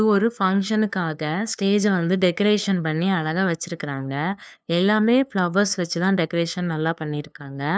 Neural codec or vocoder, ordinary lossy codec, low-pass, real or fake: codec, 16 kHz, 2 kbps, FunCodec, trained on LibriTTS, 25 frames a second; none; none; fake